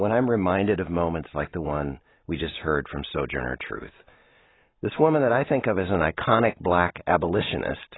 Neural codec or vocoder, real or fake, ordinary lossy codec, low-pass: none; real; AAC, 16 kbps; 7.2 kHz